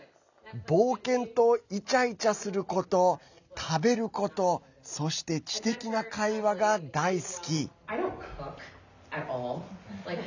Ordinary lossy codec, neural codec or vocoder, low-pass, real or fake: none; none; 7.2 kHz; real